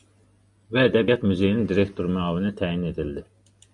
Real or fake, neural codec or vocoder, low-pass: real; none; 10.8 kHz